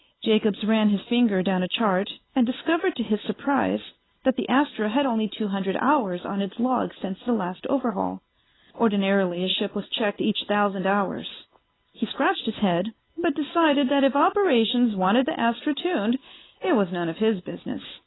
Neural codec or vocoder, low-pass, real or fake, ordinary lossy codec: none; 7.2 kHz; real; AAC, 16 kbps